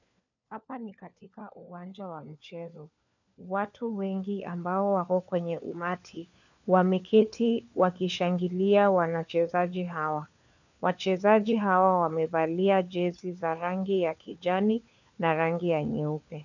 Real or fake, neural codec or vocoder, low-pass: fake; codec, 16 kHz, 4 kbps, FunCodec, trained on LibriTTS, 50 frames a second; 7.2 kHz